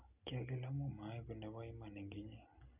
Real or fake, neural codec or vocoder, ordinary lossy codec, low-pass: real; none; none; 3.6 kHz